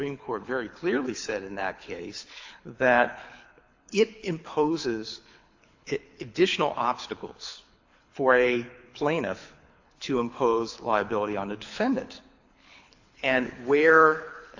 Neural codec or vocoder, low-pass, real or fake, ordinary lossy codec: codec, 24 kHz, 6 kbps, HILCodec; 7.2 kHz; fake; AAC, 48 kbps